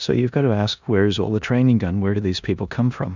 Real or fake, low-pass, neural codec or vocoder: fake; 7.2 kHz; codec, 16 kHz in and 24 kHz out, 0.9 kbps, LongCat-Audio-Codec, four codebook decoder